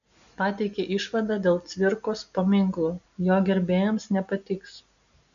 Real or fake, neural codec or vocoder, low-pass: real; none; 7.2 kHz